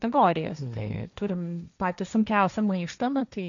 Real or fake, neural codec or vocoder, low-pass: fake; codec, 16 kHz, 1.1 kbps, Voila-Tokenizer; 7.2 kHz